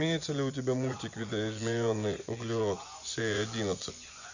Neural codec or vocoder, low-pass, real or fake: vocoder, 24 kHz, 100 mel bands, Vocos; 7.2 kHz; fake